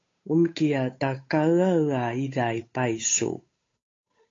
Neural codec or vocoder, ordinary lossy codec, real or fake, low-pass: codec, 16 kHz, 8 kbps, FunCodec, trained on Chinese and English, 25 frames a second; AAC, 32 kbps; fake; 7.2 kHz